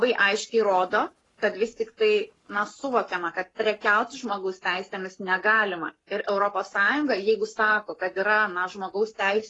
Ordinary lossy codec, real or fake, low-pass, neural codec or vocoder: AAC, 32 kbps; fake; 10.8 kHz; codec, 44.1 kHz, 7.8 kbps, Pupu-Codec